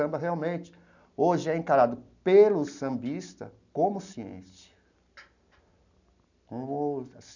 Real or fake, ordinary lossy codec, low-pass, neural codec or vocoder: real; none; 7.2 kHz; none